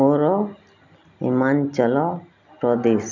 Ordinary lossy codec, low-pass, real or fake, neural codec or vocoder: none; 7.2 kHz; real; none